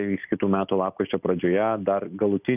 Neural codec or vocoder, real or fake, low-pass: none; real; 3.6 kHz